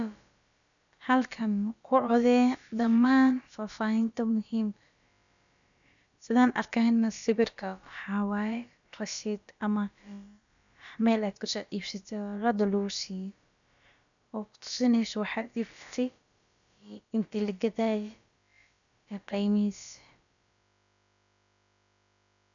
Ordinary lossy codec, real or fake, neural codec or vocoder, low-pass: none; fake; codec, 16 kHz, about 1 kbps, DyCAST, with the encoder's durations; 7.2 kHz